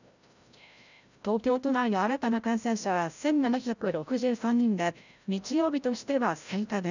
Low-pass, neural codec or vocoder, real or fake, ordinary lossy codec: 7.2 kHz; codec, 16 kHz, 0.5 kbps, FreqCodec, larger model; fake; none